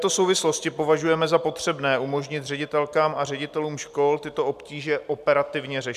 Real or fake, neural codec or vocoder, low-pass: real; none; 14.4 kHz